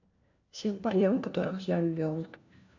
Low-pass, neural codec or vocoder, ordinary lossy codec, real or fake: 7.2 kHz; codec, 16 kHz, 1 kbps, FunCodec, trained on LibriTTS, 50 frames a second; none; fake